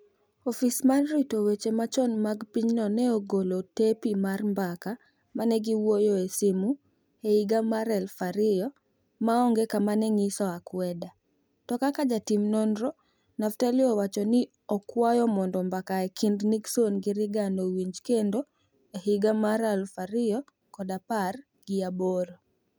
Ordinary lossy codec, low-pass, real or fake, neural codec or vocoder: none; none; real; none